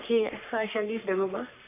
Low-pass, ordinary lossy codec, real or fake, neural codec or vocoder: 3.6 kHz; none; fake; codec, 44.1 kHz, 3.4 kbps, Pupu-Codec